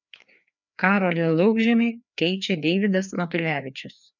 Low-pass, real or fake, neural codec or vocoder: 7.2 kHz; fake; codec, 16 kHz, 2 kbps, FreqCodec, larger model